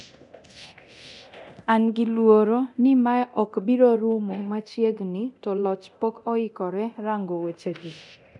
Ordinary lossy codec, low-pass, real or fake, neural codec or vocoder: none; 10.8 kHz; fake; codec, 24 kHz, 0.9 kbps, DualCodec